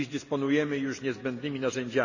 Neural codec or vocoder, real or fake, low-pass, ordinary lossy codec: none; real; 7.2 kHz; none